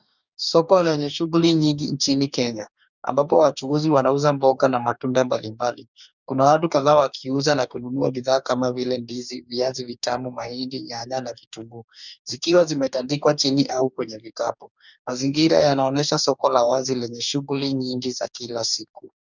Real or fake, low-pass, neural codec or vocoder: fake; 7.2 kHz; codec, 44.1 kHz, 2.6 kbps, DAC